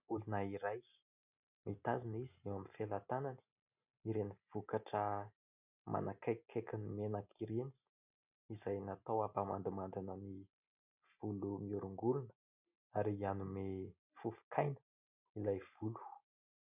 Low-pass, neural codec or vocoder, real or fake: 3.6 kHz; none; real